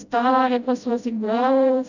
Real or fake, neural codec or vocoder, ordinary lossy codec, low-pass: fake; codec, 16 kHz, 0.5 kbps, FreqCodec, smaller model; none; 7.2 kHz